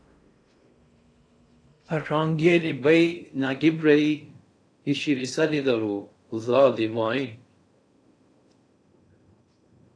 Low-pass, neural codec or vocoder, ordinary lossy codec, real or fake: 9.9 kHz; codec, 16 kHz in and 24 kHz out, 0.6 kbps, FocalCodec, streaming, 4096 codes; AAC, 48 kbps; fake